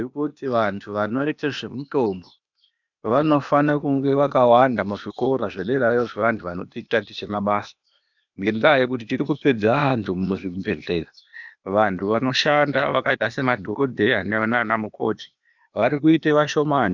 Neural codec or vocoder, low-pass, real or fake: codec, 16 kHz, 0.8 kbps, ZipCodec; 7.2 kHz; fake